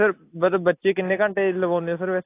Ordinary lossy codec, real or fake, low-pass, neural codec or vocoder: AAC, 24 kbps; real; 3.6 kHz; none